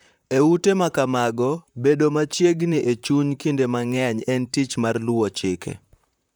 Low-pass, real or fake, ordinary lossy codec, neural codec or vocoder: none; fake; none; vocoder, 44.1 kHz, 128 mel bands, Pupu-Vocoder